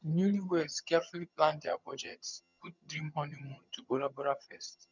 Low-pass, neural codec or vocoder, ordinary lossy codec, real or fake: 7.2 kHz; vocoder, 22.05 kHz, 80 mel bands, HiFi-GAN; none; fake